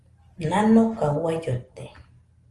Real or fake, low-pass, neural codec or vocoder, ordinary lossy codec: real; 10.8 kHz; none; Opus, 24 kbps